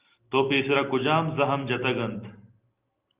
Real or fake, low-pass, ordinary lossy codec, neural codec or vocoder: real; 3.6 kHz; Opus, 24 kbps; none